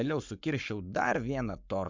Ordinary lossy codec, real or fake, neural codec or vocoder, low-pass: MP3, 64 kbps; fake; codec, 44.1 kHz, 7.8 kbps, Pupu-Codec; 7.2 kHz